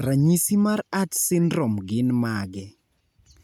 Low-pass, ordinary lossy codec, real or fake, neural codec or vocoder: none; none; real; none